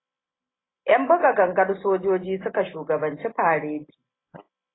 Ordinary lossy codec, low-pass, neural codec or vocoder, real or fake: AAC, 16 kbps; 7.2 kHz; none; real